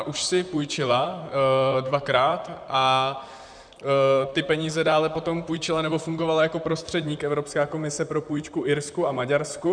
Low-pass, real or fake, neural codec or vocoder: 9.9 kHz; fake; vocoder, 44.1 kHz, 128 mel bands, Pupu-Vocoder